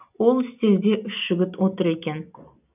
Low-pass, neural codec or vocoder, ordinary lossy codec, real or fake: 3.6 kHz; none; none; real